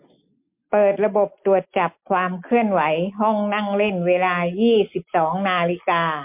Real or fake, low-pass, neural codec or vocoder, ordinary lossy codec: real; 3.6 kHz; none; MP3, 32 kbps